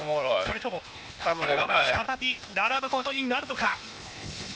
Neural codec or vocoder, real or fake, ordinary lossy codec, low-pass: codec, 16 kHz, 0.8 kbps, ZipCodec; fake; none; none